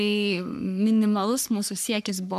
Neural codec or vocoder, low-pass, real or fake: codec, 44.1 kHz, 3.4 kbps, Pupu-Codec; 14.4 kHz; fake